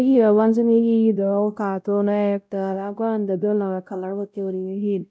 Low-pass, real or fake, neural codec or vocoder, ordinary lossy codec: none; fake; codec, 16 kHz, 0.5 kbps, X-Codec, WavLM features, trained on Multilingual LibriSpeech; none